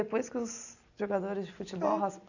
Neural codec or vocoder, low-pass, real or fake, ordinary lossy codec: none; 7.2 kHz; real; none